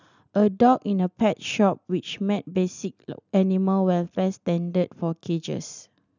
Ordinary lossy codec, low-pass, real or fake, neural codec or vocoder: none; 7.2 kHz; real; none